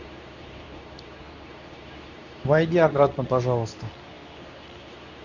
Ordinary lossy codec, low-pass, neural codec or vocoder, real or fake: none; 7.2 kHz; codec, 24 kHz, 0.9 kbps, WavTokenizer, medium speech release version 2; fake